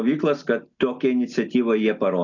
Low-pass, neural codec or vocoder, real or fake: 7.2 kHz; none; real